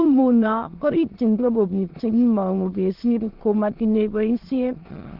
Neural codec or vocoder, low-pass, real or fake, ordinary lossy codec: autoencoder, 22.05 kHz, a latent of 192 numbers a frame, VITS, trained on many speakers; 5.4 kHz; fake; Opus, 16 kbps